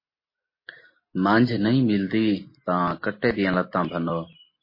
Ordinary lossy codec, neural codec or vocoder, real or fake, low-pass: MP3, 24 kbps; none; real; 5.4 kHz